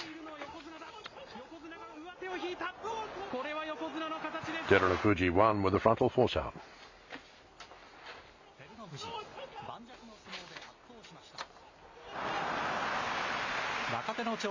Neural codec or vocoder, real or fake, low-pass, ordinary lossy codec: none; real; 7.2 kHz; MP3, 64 kbps